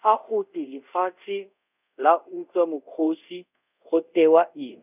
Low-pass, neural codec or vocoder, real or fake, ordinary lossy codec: 3.6 kHz; codec, 24 kHz, 0.5 kbps, DualCodec; fake; none